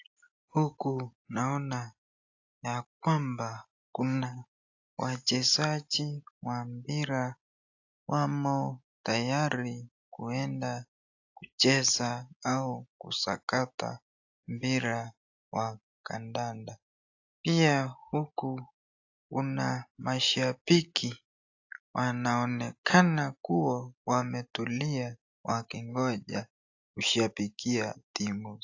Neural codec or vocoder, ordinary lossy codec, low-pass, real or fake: none; AAC, 48 kbps; 7.2 kHz; real